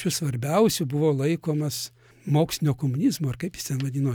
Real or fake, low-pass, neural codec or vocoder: real; 19.8 kHz; none